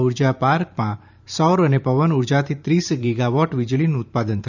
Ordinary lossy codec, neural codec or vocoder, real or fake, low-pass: none; vocoder, 44.1 kHz, 128 mel bands every 512 samples, BigVGAN v2; fake; 7.2 kHz